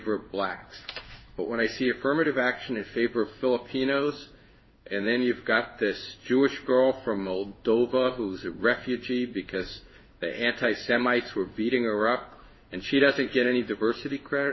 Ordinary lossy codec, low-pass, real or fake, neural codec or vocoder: MP3, 24 kbps; 7.2 kHz; fake; codec, 16 kHz in and 24 kHz out, 1 kbps, XY-Tokenizer